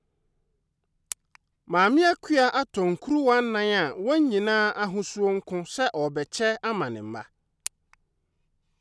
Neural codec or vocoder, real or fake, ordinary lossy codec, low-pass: none; real; none; none